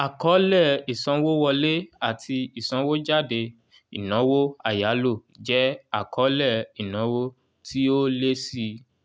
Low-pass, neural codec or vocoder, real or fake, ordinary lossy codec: none; none; real; none